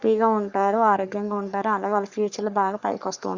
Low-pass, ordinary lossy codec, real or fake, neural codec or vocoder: 7.2 kHz; Opus, 64 kbps; fake; codec, 44.1 kHz, 3.4 kbps, Pupu-Codec